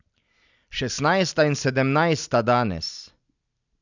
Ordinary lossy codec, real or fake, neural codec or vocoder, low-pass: none; real; none; 7.2 kHz